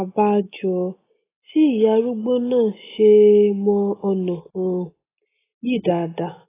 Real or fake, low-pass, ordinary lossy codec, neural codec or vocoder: real; 3.6 kHz; AAC, 16 kbps; none